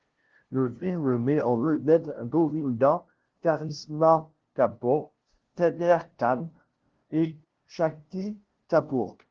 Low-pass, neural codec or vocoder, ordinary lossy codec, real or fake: 7.2 kHz; codec, 16 kHz, 0.5 kbps, FunCodec, trained on LibriTTS, 25 frames a second; Opus, 16 kbps; fake